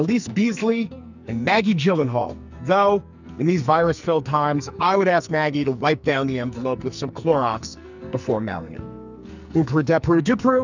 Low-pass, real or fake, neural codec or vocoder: 7.2 kHz; fake; codec, 44.1 kHz, 2.6 kbps, SNAC